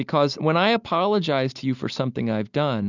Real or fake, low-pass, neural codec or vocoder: real; 7.2 kHz; none